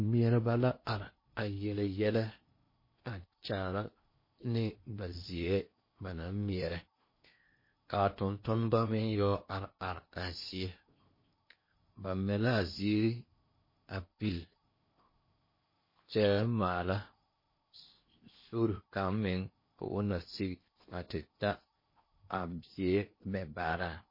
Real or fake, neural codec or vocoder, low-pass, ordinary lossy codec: fake; codec, 16 kHz in and 24 kHz out, 0.8 kbps, FocalCodec, streaming, 65536 codes; 5.4 kHz; MP3, 24 kbps